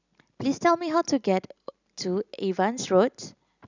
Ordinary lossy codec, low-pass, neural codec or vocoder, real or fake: none; 7.2 kHz; none; real